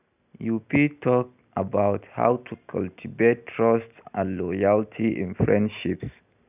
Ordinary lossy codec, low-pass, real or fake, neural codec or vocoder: none; 3.6 kHz; real; none